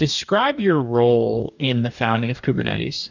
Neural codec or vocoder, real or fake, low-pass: codec, 44.1 kHz, 2.6 kbps, DAC; fake; 7.2 kHz